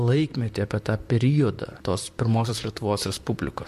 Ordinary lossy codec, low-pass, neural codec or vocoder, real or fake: MP3, 64 kbps; 14.4 kHz; vocoder, 44.1 kHz, 128 mel bands, Pupu-Vocoder; fake